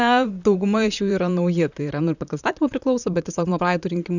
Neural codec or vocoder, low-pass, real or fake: vocoder, 44.1 kHz, 128 mel bands, Pupu-Vocoder; 7.2 kHz; fake